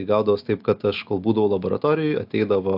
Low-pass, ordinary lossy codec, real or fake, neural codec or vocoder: 5.4 kHz; AAC, 48 kbps; real; none